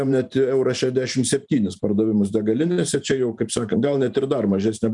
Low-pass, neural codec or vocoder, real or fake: 10.8 kHz; vocoder, 44.1 kHz, 128 mel bands every 512 samples, BigVGAN v2; fake